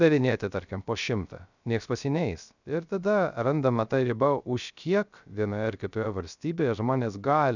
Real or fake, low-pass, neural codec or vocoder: fake; 7.2 kHz; codec, 16 kHz, 0.3 kbps, FocalCodec